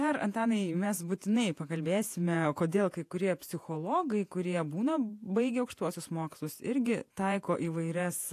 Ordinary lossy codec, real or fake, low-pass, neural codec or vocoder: AAC, 64 kbps; fake; 14.4 kHz; vocoder, 48 kHz, 128 mel bands, Vocos